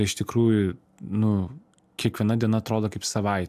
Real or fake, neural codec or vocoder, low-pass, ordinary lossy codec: real; none; 14.4 kHz; AAC, 96 kbps